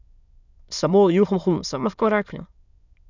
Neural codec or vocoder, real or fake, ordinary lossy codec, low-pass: autoencoder, 22.05 kHz, a latent of 192 numbers a frame, VITS, trained on many speakers; fake; none; 7.2 kHz